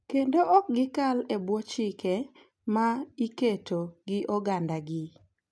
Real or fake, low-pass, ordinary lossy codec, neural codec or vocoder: real; none; none; none